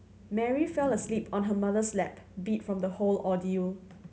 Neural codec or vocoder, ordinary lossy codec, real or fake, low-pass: none; none; real; none